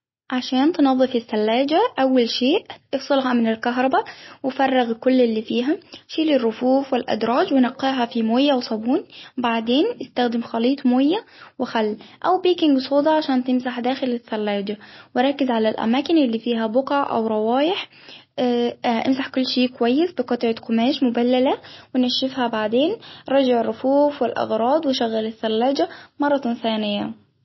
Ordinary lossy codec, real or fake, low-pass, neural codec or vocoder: MP3, 24 kbps; real; 7.2 kHz; none